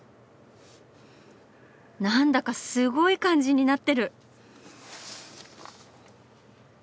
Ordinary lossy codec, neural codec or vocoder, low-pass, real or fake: none; none; none; real